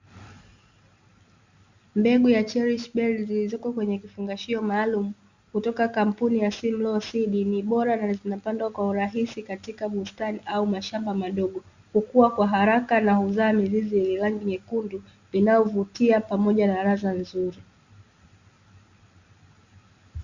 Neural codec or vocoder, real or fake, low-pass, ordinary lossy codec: none; real; 7.2 kHz; Opus, 64 kbps